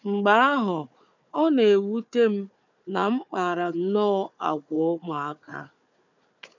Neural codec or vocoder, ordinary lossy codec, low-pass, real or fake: codec, 16 kHz, 4 kbps, FunCodec, trained on Chinese and English, 50 frames a second; none; 7.2 kHz; fake